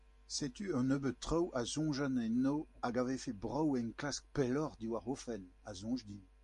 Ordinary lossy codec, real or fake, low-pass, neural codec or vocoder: MP3, 48 kbps; real; 14.4 kHz; none